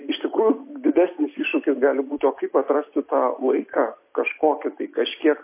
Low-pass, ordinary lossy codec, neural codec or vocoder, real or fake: 3.6 kHz; MP3, 24 kbps; none; real